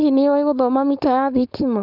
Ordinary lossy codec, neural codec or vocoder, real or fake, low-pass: none; codec, 16 kHz, 4.8 kbps, FACodec; fake; 5.4 kHz